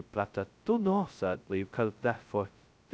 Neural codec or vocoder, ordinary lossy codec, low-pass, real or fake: codec, 16 kHz, 0.2 kbps, FocalCodec; none; none; fake